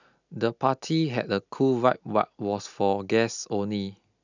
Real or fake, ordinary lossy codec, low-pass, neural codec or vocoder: real; none; 7.2 kHz; none